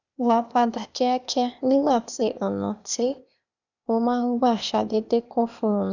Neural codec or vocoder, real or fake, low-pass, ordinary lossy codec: codec, 16 kHz, 0.8 kbps, ZipCodec; fake; 7.2 kHz; none